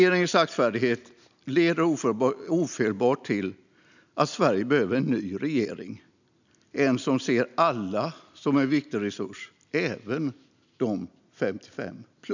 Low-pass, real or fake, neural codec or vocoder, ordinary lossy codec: 7.2 kHz; real; none; none